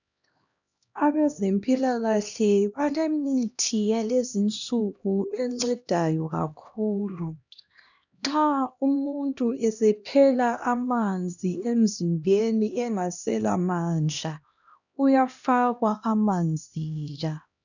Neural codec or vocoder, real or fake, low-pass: codec, 16 kHz, 1 kbps, X-Codec, HuBERT features, trained on LibriSpeech; fake; 7.2 kHz